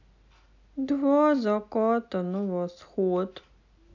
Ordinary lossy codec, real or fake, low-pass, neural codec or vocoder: none; real; 7.2 kHz; none